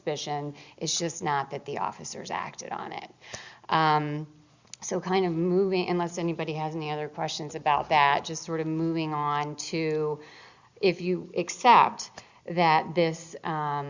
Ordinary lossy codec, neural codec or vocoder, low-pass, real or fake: Opus, 64 kbps; none; 7.2 kHz; real